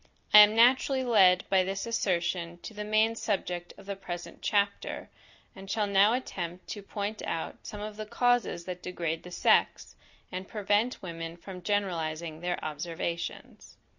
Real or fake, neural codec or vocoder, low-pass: real; none; 7.2 kHz